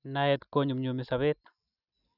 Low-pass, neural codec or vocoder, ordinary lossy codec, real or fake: 5.4 kHz; none; none; real